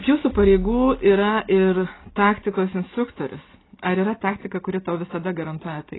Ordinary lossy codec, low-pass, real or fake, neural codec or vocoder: AAC, 16 kbps; 7.2 kHz; real; none